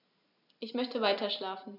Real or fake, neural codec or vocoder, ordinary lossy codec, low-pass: real; none; none; 5.4 kHz